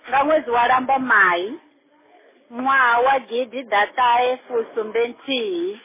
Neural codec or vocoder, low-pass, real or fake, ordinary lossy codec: none; 3.6 kHz; real; MP3, 16 kbps